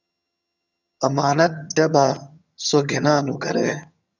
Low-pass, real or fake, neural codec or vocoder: 7.2 kHz; fake; vocoder, 22.05 kHz, 80 mel bands, HiFi-GAN